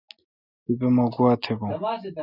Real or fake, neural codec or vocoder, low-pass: real; none; 5.4 kHz